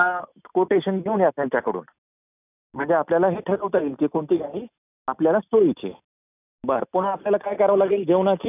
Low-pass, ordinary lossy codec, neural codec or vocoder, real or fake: 3.6 kHz; none; none; real